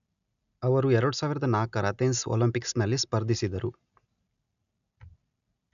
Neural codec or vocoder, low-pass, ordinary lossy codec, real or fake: none; 7.2 kHz; none; real